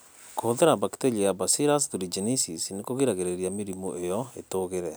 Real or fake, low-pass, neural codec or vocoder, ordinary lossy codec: real; none; none; none